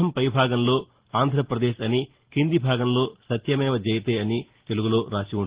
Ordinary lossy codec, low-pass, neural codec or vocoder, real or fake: Opus, 16 kbps; 3.6 kHz; none; real